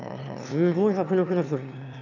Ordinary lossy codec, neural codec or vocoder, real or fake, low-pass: none; autoencoder, 22.05 kHz, a latent of 192 numbers a frame, VITS, trained on one speaker; fake; 7.2 kHz